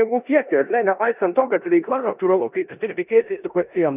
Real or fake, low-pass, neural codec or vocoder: fake; 3.6 kHz; codec, 16 kHz in and 24 kHz out, 0.9 kbps, LongCat-Audio-Codec, four codebook decoder